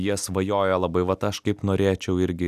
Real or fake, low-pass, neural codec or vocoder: real; 14.4 kHz; none